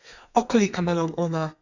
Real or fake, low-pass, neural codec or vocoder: fake; 7.2 kHz; codec, 32 kHz, 1.9 kbps, SNAC